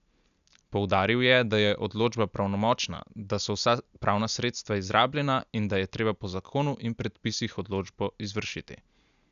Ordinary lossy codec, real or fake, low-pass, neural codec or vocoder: none; real; 7.2 kHz; none